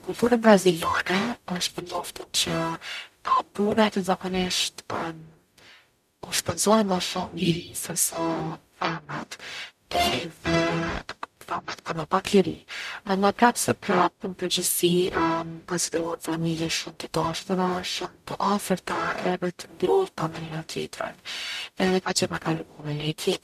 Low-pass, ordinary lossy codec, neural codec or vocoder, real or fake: 14.4 kHz; none; codec, 44.1 kHz, 0.9 kbps, DAC; fake